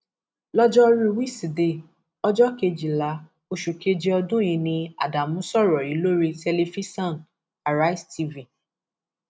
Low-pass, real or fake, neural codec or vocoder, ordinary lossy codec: none; real; none; none